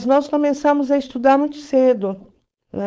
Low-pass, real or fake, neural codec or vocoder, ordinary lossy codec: none; fake; codec, 16 kHz, 4.8 kbps, FACodec; none